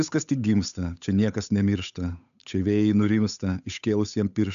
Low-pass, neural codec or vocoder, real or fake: 7.2 kHz; codec, 16 kHz, 8 kbps, FunCodec, trained on Chinese and English, 25 frames a second; fake